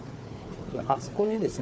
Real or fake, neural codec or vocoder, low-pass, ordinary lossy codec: fake; codec, 16 kHz, 4 kbps, FunCodec, trained on Chinese and English, 50 frames a second; none; none